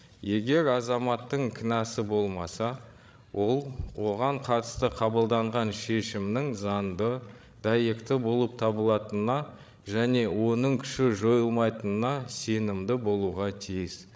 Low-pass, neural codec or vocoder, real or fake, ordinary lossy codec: none; codec, 16 kHz, 16 kbps, FreqCodec, larger model; fake; none